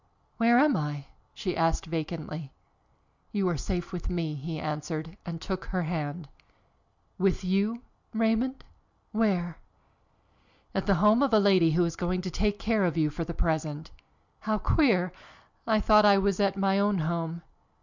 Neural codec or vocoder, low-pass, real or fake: none; 7.2 kHz; real